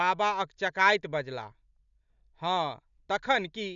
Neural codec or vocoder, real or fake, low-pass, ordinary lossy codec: none; real; 7.2 kHz; none